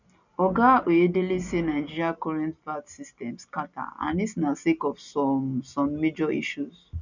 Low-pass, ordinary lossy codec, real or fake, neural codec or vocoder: 7.2 kHz; none; real; none